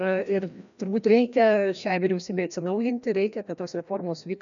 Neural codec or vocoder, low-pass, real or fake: codec, 16 kHz, 1 kbps, FreqCodec, larger model; 7.2 kHz; fake